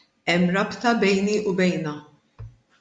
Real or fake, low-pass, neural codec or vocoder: real; 9.9 kHz; none